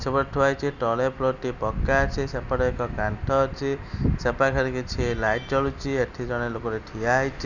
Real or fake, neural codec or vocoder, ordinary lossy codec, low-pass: real; none; none; 7.2 kHz